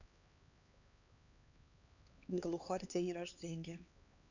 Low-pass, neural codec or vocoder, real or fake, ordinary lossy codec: 7.2 kHz; codec, 16 kHz, 2 kbps, X-Codec, HuBERT features, trained on LibriSpeech; fake; none